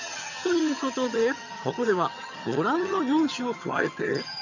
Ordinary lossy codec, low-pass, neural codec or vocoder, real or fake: none; 7.2 kHz; vocoder, 22.05 kHz, 80 mel bands, HiFi-GAN; fake